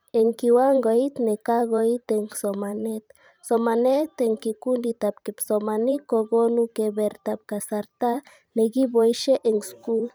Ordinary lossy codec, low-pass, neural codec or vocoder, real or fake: none; none; vocoder, 44.1 kHz, 128 mel bands every 512 samples, BigVGAN v2; fake